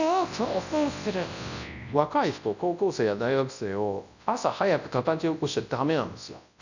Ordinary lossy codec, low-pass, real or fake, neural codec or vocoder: none; 7.2 kHz; fake; codec, 24 kHz, 0.9 kbps, WavTokenizer, large speech release